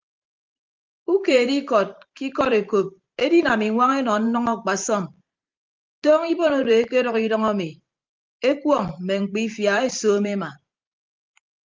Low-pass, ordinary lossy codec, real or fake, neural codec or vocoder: 7.2 kHz; Opus, 24 kbps; fake; vocoder, 44.1 kHz, 128 mel bands every 512 samples, BigVGAN v2